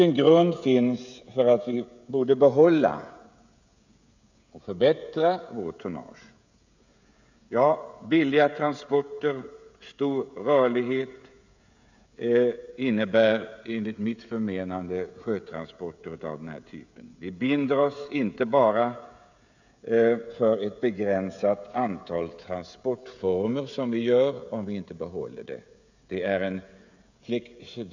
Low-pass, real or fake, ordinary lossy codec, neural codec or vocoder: 7.2 kHz; fake; none; codec, 16 kHz, 16 kbps, FreqCodec, smaller model